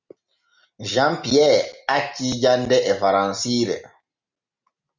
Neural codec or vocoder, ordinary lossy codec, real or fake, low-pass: none; Opus, 64 kbps; real; 7.2 kHz